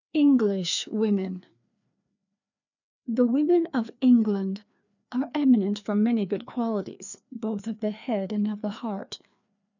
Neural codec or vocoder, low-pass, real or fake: codec, 16 kHz, 2 kbps, FreqCodec, larger model; 7.2 kHz; fake